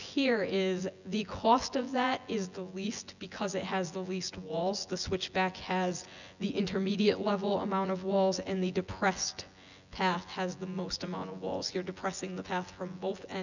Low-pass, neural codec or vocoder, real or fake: 7.2 kHz; vocoder, 24 kHz, 100 mel bands, Vocos; fake